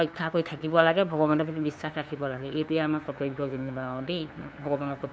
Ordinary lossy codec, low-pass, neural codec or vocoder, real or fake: none; none; codec, 16 kHz, 2 kbps, FunCodec, trained on LibriTTS, 25 frames a second; fake